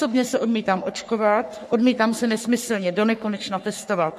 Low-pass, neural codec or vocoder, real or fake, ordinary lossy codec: 14.4 kHz; codec, 44.1 kHz, 3.4 kbps, Pupu-Codec; fake; MP3, 64 kbps